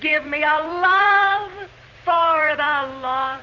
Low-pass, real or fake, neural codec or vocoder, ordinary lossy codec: 7.2 kHz; fake; vocoder, 44.1 kHz, 128 mel bands every 256 samples, BigVGAN v2; Opus, 64 kbps